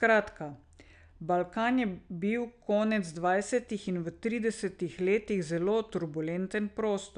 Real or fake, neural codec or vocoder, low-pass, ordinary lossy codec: real; none; 9.9 kHz; none